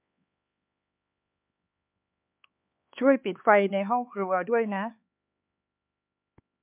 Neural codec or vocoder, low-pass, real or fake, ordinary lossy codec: codec, 16 kHz, 4 kbps, X-Codec, HuBERT features, trained on LibriSpeech; 3.6 kHz; fake; MP3, 32 kbps